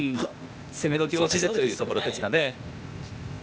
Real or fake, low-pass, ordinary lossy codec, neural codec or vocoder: fake; none; none; codec, 16 kHz, 0.8 kbps, ZipCodec